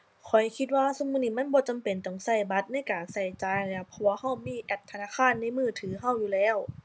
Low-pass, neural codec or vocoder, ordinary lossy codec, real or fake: none; none; none; real